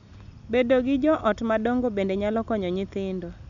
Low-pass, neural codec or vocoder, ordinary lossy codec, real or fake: 7.2 kHz; none; none; real